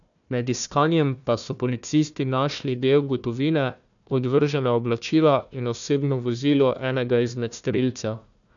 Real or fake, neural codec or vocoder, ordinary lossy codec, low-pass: fake; codec, 16 kHz, 1 kbps, FunCodec, trained on Chinese and English, 50 frames a second; none; 7.2 kHz